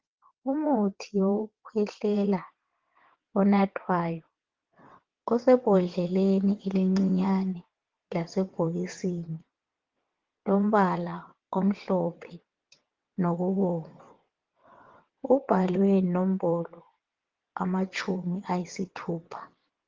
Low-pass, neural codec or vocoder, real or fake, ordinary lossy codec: 7.2 kHz; vocoder, 22.05 kHz, 80 mel bands, WaveNeXt; fake; Opus, 16 kbps